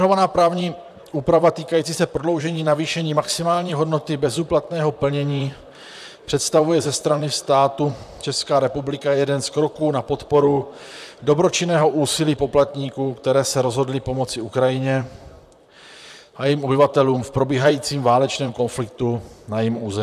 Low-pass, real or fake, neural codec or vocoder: 14.4 kHz; fake; vocoder, 44.1 kHz, 128 mel bands, Pupu-Vocoder